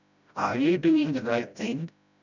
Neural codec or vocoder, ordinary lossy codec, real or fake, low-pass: codec, 16 kHz, 0.5 kbps, FreqCodec, smaller model; none; fake; 7.2 kHz